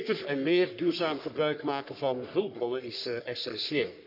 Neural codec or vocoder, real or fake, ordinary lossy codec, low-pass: codec, 44.1 kHz, 3.4 kbps, Pupu-Codec; fake; none; 5.4 kHz